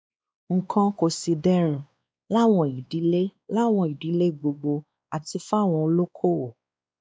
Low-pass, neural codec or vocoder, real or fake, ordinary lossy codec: none; codec, 16 kHz, 2 kbps, X-Codec, WavLM features, trained on Multilingual LibriSpeech; fake; none